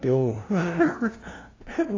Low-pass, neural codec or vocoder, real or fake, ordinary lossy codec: 7.2 kHz; codec, 16 kHz, 0.5 kbps, FunCodec, trained on LibriTTS, 25 frames a second; fake; AAC, 32 kbps